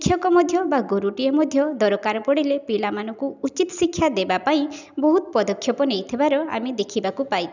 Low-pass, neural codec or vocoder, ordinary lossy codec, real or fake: 7.2 kHz; none; none; real